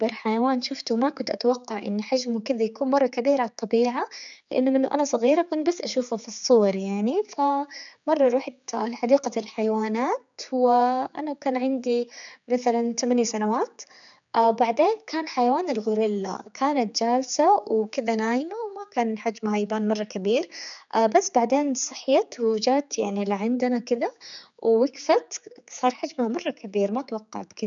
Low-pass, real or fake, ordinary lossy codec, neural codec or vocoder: 7.2 kHz; fake; none; codec, 16 kHz, 4 kbps, X-Codec, HuBERT features, trained on general audio